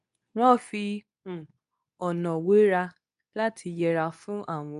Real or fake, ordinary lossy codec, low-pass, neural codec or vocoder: fake; none; 10.8 kHz; codec, 24 kHz, 0.9 kbps, WavTokenizer, medium speech release version 2